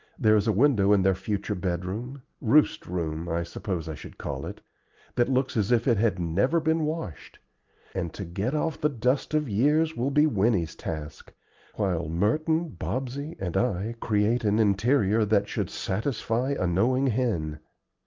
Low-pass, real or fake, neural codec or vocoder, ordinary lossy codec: 7.2 kHz; real; none; Opus, 32 kbps